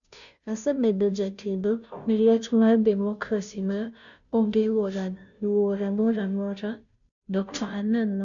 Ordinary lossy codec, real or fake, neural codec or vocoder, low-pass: none; fake; codec, 16 kHz, 0.5 kbps, FunCodec, trained on Chinese and English, 25 frames a second; 7.2 kHz